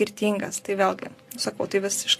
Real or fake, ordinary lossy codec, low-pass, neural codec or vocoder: fake; AAC, 64 kbps; 14.4 kHz; vocoder, 44.1 kHz, 128 mel bands every 256 samples, BigVGAN v2